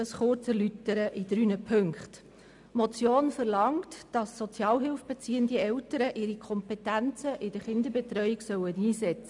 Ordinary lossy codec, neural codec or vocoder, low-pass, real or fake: none; none; 10.8 kHz; real